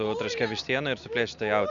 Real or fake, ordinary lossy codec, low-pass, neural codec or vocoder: real; Opus, 64 kbps; 7.2 kHz; none